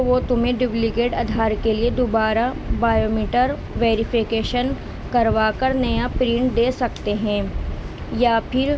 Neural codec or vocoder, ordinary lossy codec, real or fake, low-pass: none; none; real; none